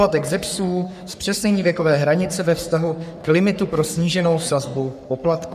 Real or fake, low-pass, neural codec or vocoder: fake; 14.4 kHz; codec, 44.1 kHz, 3.4 kbps, Pupu-Codec